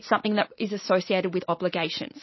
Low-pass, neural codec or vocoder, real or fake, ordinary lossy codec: 7.2 kHz; none; real; MP3, 24 kbps